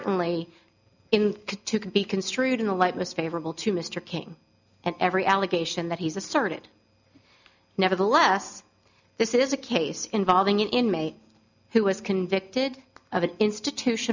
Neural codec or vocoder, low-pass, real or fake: none; 7.2 kHz; real